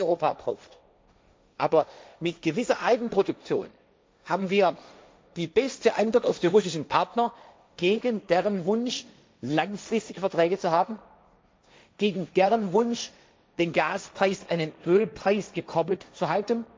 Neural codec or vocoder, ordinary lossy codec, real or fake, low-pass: codec, 16 kHz, 1.1 kbps, Voila-Tokenizer; none; fake; none